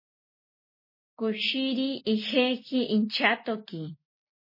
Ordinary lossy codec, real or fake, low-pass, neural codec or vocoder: MP3, 24 kbps; real; 5.4 kHz; none